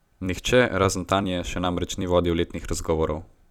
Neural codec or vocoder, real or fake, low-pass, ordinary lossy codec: vocoder, 44.1 kHz, 128 mel bands every 256 samples, BigVGAN v2; fake; 19.8 kHz; none